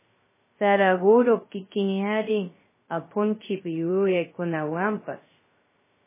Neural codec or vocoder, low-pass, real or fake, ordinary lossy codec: codec, 16 kHz, 0.2 kbps, FocalCodec; 3.6 kHz; fake; MP3, 16 kbps